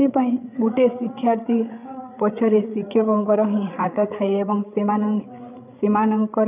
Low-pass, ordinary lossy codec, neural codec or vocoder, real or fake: 3.6 kHz; none; codec, 16 kHz, 8 kbps, FreqCodec, larger model; fake